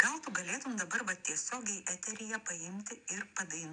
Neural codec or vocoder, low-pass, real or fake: none; 9.9 kHz; real